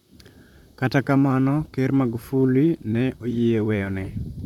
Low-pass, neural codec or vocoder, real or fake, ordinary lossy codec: 19.8 kHz; vocoder, 44.1 kHz, 128 mel bands, Pupu-Vocoder; fake; none